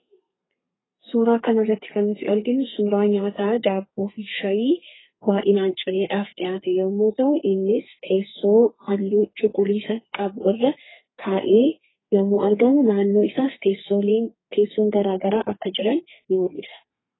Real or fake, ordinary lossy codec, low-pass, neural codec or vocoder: fake; AAC, 16 kbps; 7.2 kHz; codec, 32 kHz, 1.9 kbps, SNAC